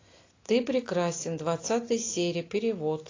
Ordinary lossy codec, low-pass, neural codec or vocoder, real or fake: AAC, 32 kbps; 7.2 kHz; none; real